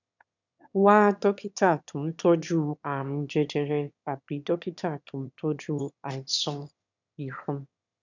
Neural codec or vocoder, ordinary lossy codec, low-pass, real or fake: autoencoder, 22.05 kHz, a latent of 192 numbers a frame, VITS, trained on one speaker; none; 7.2 kHz; fake